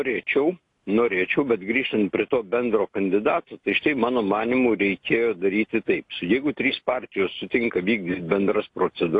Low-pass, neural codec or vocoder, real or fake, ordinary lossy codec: 10.8 kHz; none; real; AAC, 48 kbps